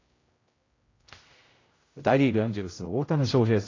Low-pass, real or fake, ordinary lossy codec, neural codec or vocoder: 7.2 kHz; fake; AAC, 32 kbps; codec, 16 kHz, 0.5 kbps, X-Codec, HuBERT features, trained on general audio